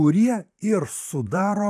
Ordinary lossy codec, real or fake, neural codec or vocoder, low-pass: AAC, 96 kbps; fake; vocoder, 44.1 kHz, 128 mel bands, Pupu-Vocoder; 14.4 kHz